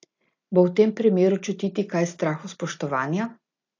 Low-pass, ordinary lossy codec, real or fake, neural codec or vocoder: 7.2 kHz; AAC, 48 kbps; real; none